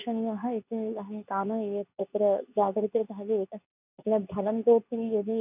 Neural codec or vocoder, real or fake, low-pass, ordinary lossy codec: codec, 24 kHz, 0.9 kbps, WavTokenizer, medium speech release version 2; fake; 3.6 kHz; none